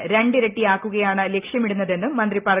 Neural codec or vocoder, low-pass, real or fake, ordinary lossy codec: none; 3.6 kHz; real; Opus, 24 kbps